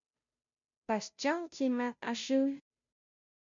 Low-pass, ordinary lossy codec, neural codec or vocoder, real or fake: 7.2 kHz; MP3, 64 kbps; codec, 16 kHz, 0.5 kbps, FunCodec, trained on Chinese and English, 25 frames a second; fake